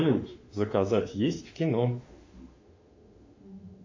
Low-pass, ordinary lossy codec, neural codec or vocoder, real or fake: 7.2 kHz; AAC, 48 kbps; autoencoder, 48 kHz, 32 numbers a frame, DAC-VAE, trained on Japanese speech; fake